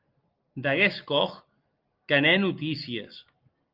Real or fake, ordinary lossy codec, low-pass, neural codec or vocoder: real; Opus, 24 kbps; 5.4 kHz; none